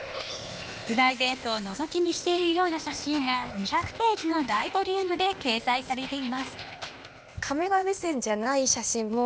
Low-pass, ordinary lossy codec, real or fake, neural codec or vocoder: none; none; fake; codec, 16 kHz, 0.8 kbps, ZipCodec